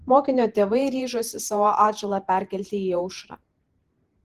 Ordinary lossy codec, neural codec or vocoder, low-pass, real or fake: Opus, 16 kbps; vocoder, 48 kHz, 128 mel bands, Vocos; 14.4 kHz; fake